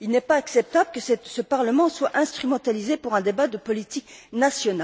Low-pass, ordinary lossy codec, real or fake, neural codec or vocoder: none; none; real; none